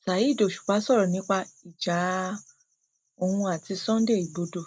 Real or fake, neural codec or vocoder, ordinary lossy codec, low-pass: real; none; none; none